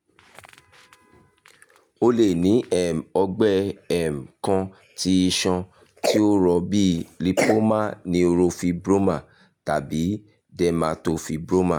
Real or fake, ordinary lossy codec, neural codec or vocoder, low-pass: real; none; none; 19.8 kHz